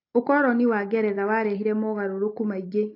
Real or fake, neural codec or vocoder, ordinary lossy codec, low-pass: real; none; AAC, 32 kbps; 5.4 kHz